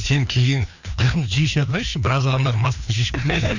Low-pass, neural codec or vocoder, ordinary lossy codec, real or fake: 7.2 kHz; codec, 16 kHz, 2 kbps, FreqCodec, larger model; none; fake